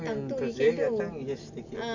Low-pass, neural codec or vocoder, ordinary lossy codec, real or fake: 7.2 kHz; none; none; real